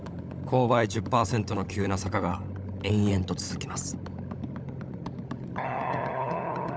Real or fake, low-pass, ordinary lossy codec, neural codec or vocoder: fake; none; none; codec, 16 kHz, 16 kbps, FunCodec, trained on LibriTTS, 50 frames a second